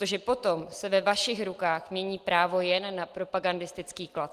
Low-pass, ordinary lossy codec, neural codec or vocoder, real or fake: 14.4 kHz; Opus, 24 kbps; none; real